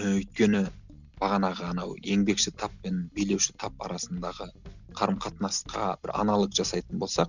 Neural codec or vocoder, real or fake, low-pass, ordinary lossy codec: none; real; 7.2 kHz; none